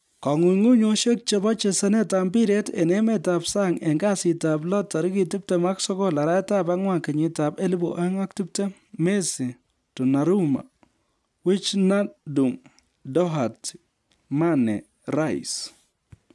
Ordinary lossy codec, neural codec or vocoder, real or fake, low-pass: none; none; real; none